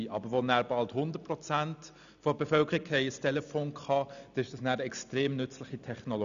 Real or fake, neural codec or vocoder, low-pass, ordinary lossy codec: real; none; 7.2 kHz; none